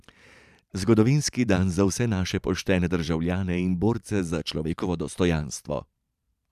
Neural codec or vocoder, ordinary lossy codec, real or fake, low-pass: vocoder, 44.1 kHz, 128 mel bands every 512 samples, BigVGAN v2; none; fake; 14.4 kHz